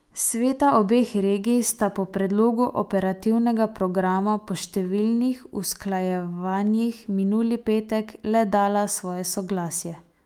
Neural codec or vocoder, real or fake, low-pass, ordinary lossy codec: autoencoder, 48 kHz, 128 numbers a frame, DAC-VAE, trained on Japanese speech; fake; 19.8 kHz; Opus, 32 kbps